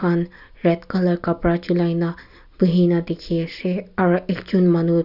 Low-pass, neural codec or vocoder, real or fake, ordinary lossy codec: 5.4 kHz; none; real; none